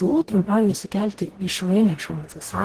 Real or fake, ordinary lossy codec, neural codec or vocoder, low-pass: fake; Opus, 16 kbps; codec, 44.1 kHz, 0.9 kbps, DAC; 14.4 kHz